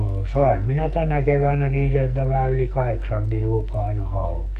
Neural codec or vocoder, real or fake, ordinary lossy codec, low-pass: codec, 44.1 kHz, 2.6 kbps, SNAC; fake; none; 14.4 kHz